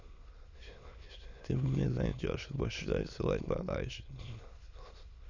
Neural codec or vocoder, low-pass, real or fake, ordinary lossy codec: autoencoder, 22.05 kHz, a latent of 192 numbers a frame, VITS, trained on many speakers; 7.2 kHz; fake; Opus, 64 kbps